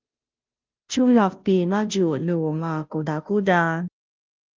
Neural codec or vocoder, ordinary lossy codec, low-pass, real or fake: codec, 16 kHz, 0.5 kbps, FunCodec, trained on Chinese and English, 25 frames a second; Opus, 24 kbps; 7.2 kHz; fake